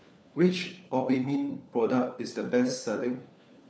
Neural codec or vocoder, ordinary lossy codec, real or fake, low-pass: codec, 16 kHz, 4 kbps, FunCodec, trained on LibriTTS, 50 frames a second; none; fake; none